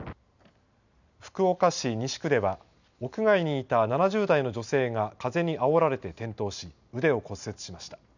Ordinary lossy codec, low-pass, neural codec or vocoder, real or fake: none; 7.2 kHz; none; real